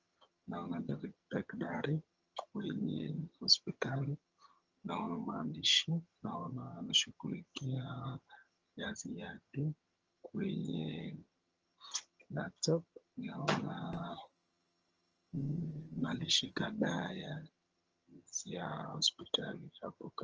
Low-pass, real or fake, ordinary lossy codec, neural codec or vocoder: 7.2 kHz; fake; Opus, 24 kbps; vocoder, 22.05 kHz, 80 mel bands, HiFi-GAN